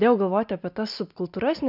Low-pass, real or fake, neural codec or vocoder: 5.4 kHz; real; none